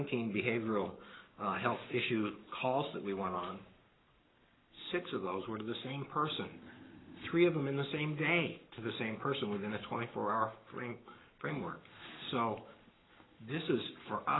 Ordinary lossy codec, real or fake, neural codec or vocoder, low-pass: AAC, 16 kbps; fake; codec, 44.1 kHz, 7.8 kbps, Pupu-Codec; 7.2 kHz